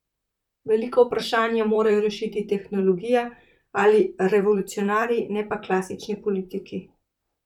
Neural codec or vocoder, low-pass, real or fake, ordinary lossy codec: vocoder, 44.1 kHz, 128 mel bands, Pupu-Vocoder; 19.8 kHz; fake; none